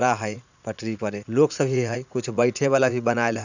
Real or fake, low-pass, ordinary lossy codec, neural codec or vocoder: fake; 7.2 kHz; none; vocoder, 44.1 kHz, 80 mel bands, Vocos